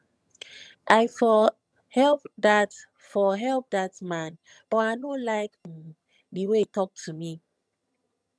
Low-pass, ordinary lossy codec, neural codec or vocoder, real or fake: none; none; vocoder, 22.05 kHz, 80 mel bands, HiFi-GAN; fake